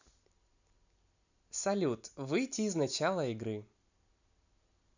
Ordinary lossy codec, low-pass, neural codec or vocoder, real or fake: none; 7.2 kHz; none; real